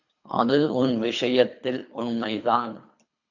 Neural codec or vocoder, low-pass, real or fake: codec, 24 kHz, 3 kbps, HILCodec; 7.2 kHz; fake